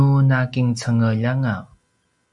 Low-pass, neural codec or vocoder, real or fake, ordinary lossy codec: 10.8 kHz; none; real; Opus, 64 kbps